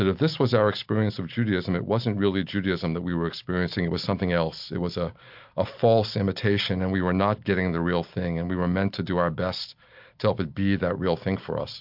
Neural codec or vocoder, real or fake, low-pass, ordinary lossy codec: none; real; 5.4 kHz; MP3, 48 kbps